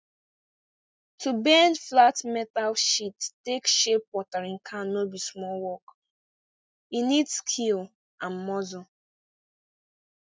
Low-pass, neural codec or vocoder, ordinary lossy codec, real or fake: none; none; none; real